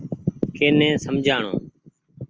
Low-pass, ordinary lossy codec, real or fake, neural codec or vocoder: 7.2 kHz; Opus, 24 kbps; real; none